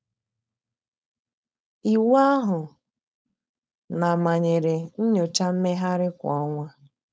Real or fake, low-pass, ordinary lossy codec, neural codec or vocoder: fake; none; none; codec, 16 kHz, 4.8 kbps, FACodec